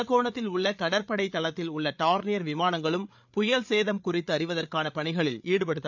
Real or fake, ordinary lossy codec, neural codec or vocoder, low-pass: fake; none; codec, 16 kHz, 8 kbps, FreqCodec, larger model; 7.2 kHz